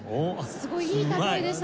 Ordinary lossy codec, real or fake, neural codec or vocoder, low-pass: none; real; none; none